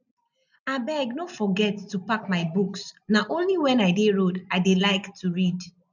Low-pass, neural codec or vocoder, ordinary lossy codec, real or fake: 7.2 kHz; none; none; real